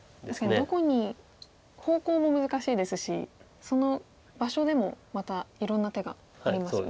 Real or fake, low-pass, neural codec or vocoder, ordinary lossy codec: real; none; none; none